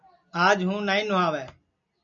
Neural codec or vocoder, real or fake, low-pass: none; real; 7.2 kHz